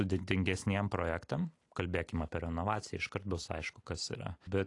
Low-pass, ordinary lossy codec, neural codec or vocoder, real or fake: 10.8 kHz; AAC, 48 kbps; none; real